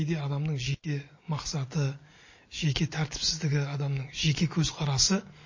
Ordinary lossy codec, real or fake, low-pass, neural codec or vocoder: MP3, 32 kbps; real; 7.2 kHz; none